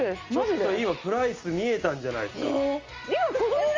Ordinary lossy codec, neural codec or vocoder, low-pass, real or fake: Opus, 32 kbps; none; 7.2 kHz; real